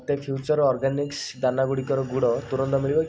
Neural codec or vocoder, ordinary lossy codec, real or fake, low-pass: none; none; real; none